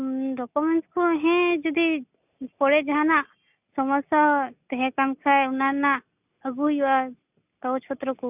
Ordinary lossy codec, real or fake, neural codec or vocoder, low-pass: none; real; none; 3.6 kHz